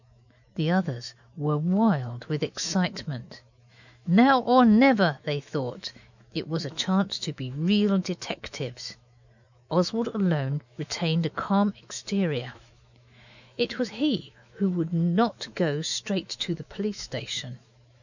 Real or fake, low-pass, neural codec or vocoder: fake; 7.2 kHz; autoencoder, 48 kHz, 128 numbers a frame, DAC-VAE, trained on Japanese speech